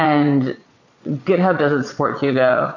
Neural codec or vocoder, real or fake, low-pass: vocoder, 22.05 kHz, 80 mel bands, Vocos; fake; 7.2 kHz